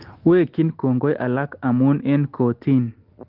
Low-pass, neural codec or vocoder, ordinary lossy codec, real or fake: 5.4 kHz; none; Opus, 16 kbps; real